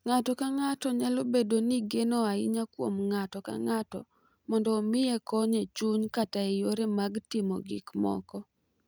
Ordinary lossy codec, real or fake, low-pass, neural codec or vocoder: none; real; none; none